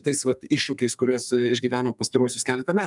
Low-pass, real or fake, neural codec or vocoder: 10.8 kHz; fake; codec, 32 kHz, 1.9 kbps, SNAC